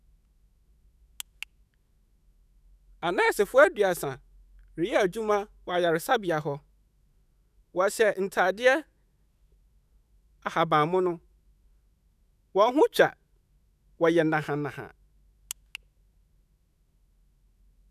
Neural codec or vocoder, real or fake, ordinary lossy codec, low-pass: autoencoder, 48 kHz, 128 numbers a frame, DAC-VAE, trained on Japanese speech; fake; none; 14.4 kHz